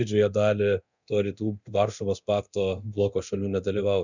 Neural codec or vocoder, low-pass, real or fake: codec, 24 kHz, 0.9 kbps, DualCodec; 7.2 kHz; fake